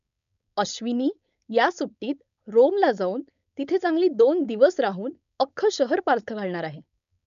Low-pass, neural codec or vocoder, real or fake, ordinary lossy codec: 7.2 kHz; codec, 16 kHz, 4.8 kbps, FACodec; fake; none